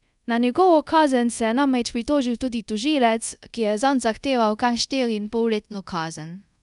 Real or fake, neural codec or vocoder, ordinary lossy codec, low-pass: fake; codec, 24 kHz, 0.5 kbps, DualCodec; none; 10.8 kHz